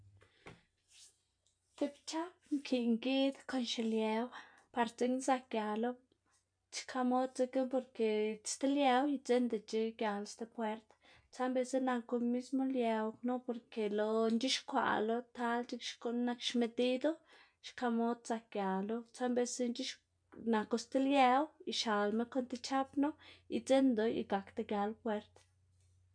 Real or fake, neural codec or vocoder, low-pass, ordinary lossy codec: real; none; 9.9 kHz; none